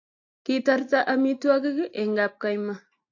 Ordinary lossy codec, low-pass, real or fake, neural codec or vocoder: AAC, 48 kbps; 7.2 kHz; real; none